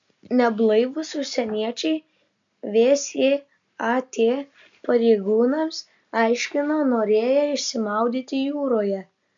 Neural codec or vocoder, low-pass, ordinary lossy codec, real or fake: none; 7.2 kHz; MP3, 64 kbps; real